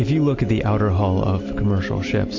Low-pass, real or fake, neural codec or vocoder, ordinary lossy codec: 7.2 kHz; real; none; AAC, 48 kbps